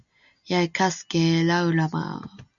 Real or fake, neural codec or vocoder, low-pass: real; none; 7.2 kHz